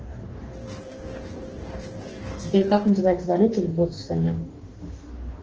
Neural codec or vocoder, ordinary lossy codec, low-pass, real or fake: codec, 44.1 kHz, 2.6 kbps, DAC; Opus, 16 kbps; 7.2 kHz; fake